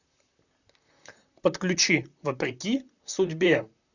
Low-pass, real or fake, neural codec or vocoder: 7.2 kHz; fake; vocoder, 44.1 kHz, 128 mel bands, Pupu-Vocoder